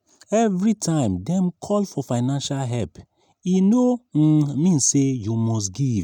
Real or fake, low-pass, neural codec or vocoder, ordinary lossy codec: real; 19.8 kHz; none; none